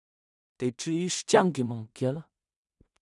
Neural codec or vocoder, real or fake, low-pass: codec, 16 kHz in and 24 kHz out, 0.4 kbps, LongCat-Audio-Codec, two codebook decoder; fake; 10.8 kHz